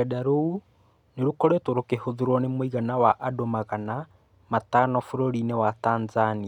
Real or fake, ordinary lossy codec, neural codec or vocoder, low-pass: real; none; none; 19.8 kHz